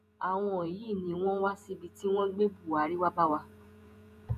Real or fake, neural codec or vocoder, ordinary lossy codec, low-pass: fake; vocoder, 48 kHz, 128 mel bands, Vocos; none; 14.4 kHz